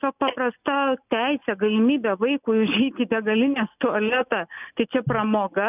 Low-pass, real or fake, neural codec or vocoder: 3.6 kHz; real; none